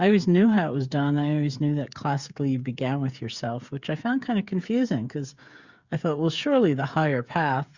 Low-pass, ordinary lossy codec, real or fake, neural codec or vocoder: 7.2 kHz; Opus, 64 kbps; fake; codec, 16 kHz, 8 kbps, FreqCodec, smaller model